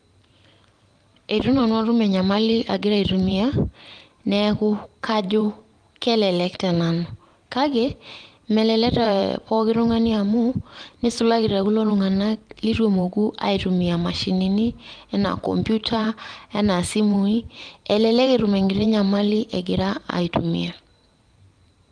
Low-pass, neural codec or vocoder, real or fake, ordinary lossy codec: 9.9 kHz; vocoder, 44.1 kHz, 128 mel bands every 512 samples, BigVGAN v2; fake; Opus, 24 kbps